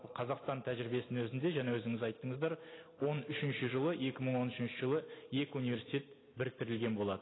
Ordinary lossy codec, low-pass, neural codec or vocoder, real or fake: AAC, 16 kbps; 7.2 kHz; none; real